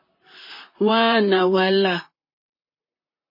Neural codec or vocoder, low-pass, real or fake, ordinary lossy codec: vocoder, 44.1 kHz, 128 mel bands, Pupu-Vocoder; 5.4 kHz; fake; MP3, 24 kbps